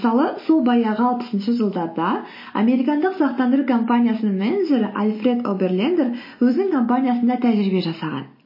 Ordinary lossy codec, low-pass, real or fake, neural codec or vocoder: MP3, 24 kbps; 5.4 kHz; real; none